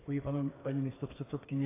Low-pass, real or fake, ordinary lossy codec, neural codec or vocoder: 3.6 kHz; fake; AAC, 16 kbps; codec, 44.1 kHz, 2.6 kbps, SNAC